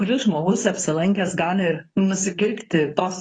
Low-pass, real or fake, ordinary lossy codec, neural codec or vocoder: 9.9 kHz; fake; AAC, 32 kbps; codec, 24 kHz, 0.9 kbps, WavTokenizer, medium speech release version 2